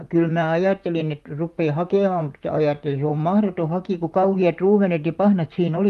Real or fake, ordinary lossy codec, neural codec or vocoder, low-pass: fake; Opus, 32 kbps; vocoder, 44.1 kHz, 128 mel bands, Pupu-Vocoder; 14.4 kHz